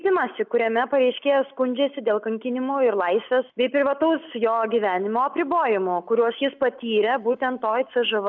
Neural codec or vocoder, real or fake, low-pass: none; real; 7.2 kHz